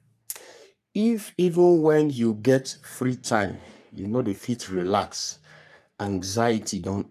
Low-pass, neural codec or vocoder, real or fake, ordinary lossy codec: 14.4 kHz; codec, 44.1 kHz, 3.4 kbps, Pupu-Codec; fake; none